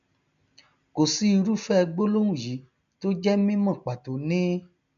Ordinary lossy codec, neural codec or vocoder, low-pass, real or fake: none; none; 7.2 kHz; real